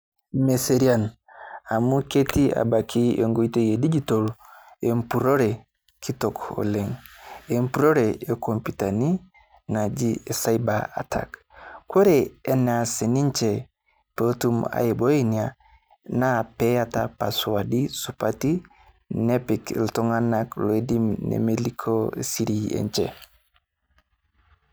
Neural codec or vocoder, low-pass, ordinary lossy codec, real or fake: none; none; none; real